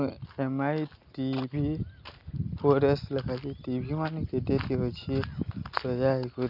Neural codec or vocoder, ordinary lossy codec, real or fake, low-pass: none; none; real; 5.4 kHz